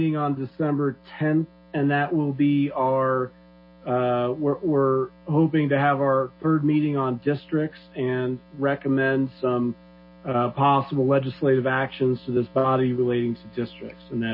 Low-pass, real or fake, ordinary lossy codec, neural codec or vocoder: 5.4 kHz; real; MP3, 24 kbps; none